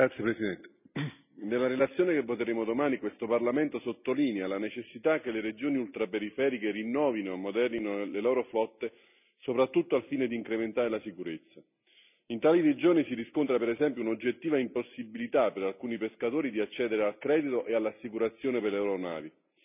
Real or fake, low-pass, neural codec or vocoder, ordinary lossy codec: real; 3.6 kHz; none; none